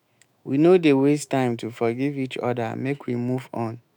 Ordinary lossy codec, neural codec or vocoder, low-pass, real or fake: none; autoencoder, 48 kHz, 128 numbers a frame, DAC-VAE, trained on Japanese speech; 19.8 kHz; fake